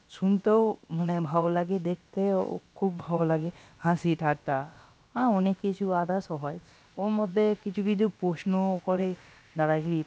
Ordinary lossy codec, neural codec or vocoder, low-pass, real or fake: none; codec, 16 kHz, about 1 kbps, DyCAST, with the encoder's durations; none; fake